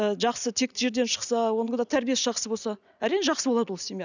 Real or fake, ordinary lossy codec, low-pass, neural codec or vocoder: real; none; 7.2 kHz; none